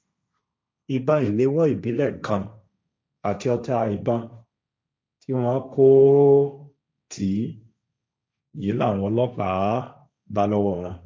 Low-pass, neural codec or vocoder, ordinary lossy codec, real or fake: none; codec, 16 kHz, 1.1 kbps, Voila-Tokenizer; none; fake